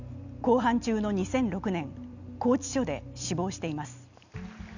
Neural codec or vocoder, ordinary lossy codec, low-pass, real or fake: none; none; 7.2 kHz; real